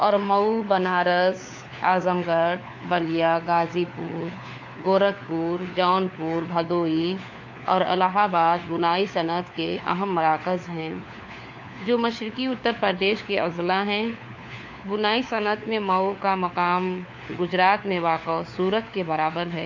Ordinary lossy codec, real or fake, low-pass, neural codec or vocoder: AAC, 48 kbps; fake; 7.2 kHz; codec, 16 kHz, 4 kbps, FunCodec, trained on LibriTTS, 50 frames a second